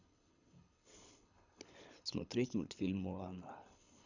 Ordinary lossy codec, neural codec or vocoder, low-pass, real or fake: none; codec, 24 kHz, 6 kbps, HILCodec; 7.2 kHz; fake